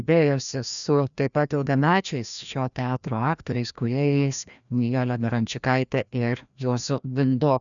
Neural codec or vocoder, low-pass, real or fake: codec, 16 kHz, 1 kbps, FreqCodec, larger model; 7.2 kHz; fake